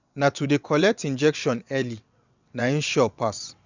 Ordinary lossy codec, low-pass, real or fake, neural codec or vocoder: none; 7.2 kHz; fake; vocoder, 22.05 kHz, 80 mel bands, WaveNeXt